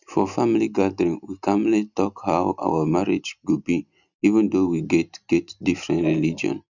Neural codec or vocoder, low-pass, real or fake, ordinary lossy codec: none; 7.2 kHz; real; none